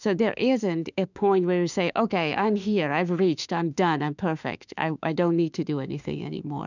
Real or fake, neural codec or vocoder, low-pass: fake; autoencoder, 48 kHz, 32 numbers a frame, DAC-VAE, trained on Japanese speech; 7.2 kHz